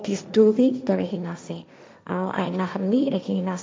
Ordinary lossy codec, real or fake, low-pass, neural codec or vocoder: none; fake; none; codec, 16 kHz, 1.1 kbps, Voila-Tokenizer